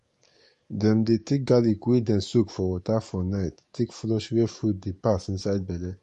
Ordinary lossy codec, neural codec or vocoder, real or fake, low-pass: MP3, 48 kbps; codec, 44.1 kHz, 7.8 kbps, DAC; fake; 14.4 kHz